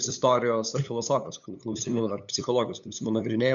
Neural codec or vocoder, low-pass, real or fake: codec, 16 kHz, 8 kbps, FunCodec, trained on LibriTTS, 25 frames a second; 7.2 kHz; fake